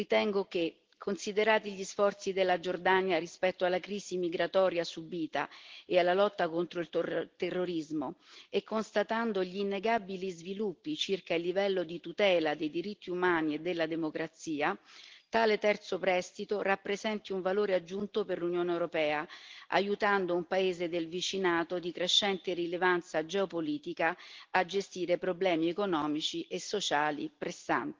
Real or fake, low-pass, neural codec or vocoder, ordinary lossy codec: real; 7.2 kHz; none; Opus, 16 kbps